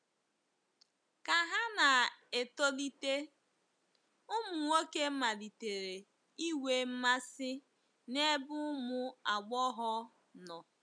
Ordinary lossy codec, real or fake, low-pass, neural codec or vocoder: none; real; 9.9 kHz; none